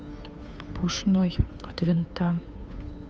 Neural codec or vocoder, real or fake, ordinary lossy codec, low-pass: codec, 16 kHz, 2 kbps, FunCodec, trained on Chinese and English, 25 frames a second; fake; none; none